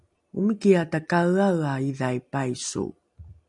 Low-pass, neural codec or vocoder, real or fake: 10.8 kHz; none; real